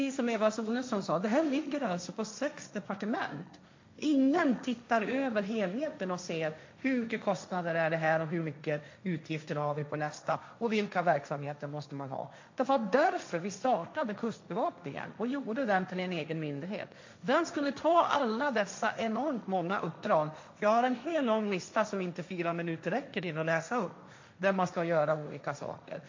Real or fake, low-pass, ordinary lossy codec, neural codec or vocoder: fake; 7.2 kHz; MP3, 48 kbps; codec, 16 kHz, 1.1 kbps, Voila-Tokenizer